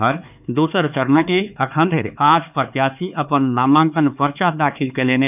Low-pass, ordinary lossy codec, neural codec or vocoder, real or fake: 3.6 kHz; none; codec, 16 kHz, 4 kbps, X-Codec, HuBERT features, trained on LibriSpeech; fake